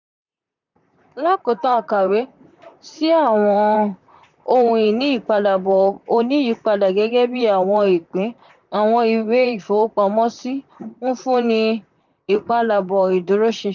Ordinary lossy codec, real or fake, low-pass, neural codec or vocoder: none; fake; 7.2 kHz; vocoder, 44.1 kHz, 128 mel bands, Pupu-Vocoder